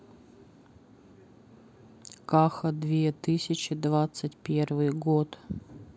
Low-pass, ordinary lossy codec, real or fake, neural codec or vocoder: none; none; real; none